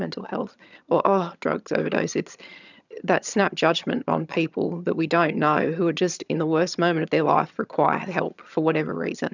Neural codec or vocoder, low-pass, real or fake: vocoder, 22.05 kHz, 80 mel bands, HiFi-GAN; 7.2 kHz; fake